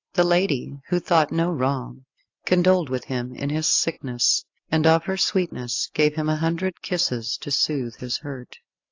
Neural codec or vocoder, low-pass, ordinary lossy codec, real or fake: none; 7.2 kHz; AAC, 48 kbps; real